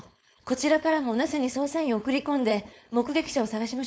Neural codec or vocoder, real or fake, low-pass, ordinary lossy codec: codec, 16 kHz, 4.8 kbps, FACodec; fake; none; none